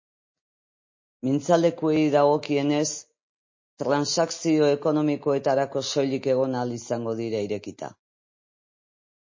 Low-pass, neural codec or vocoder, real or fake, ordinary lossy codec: 7.2 kHz; none; real; MP3, 32 kbps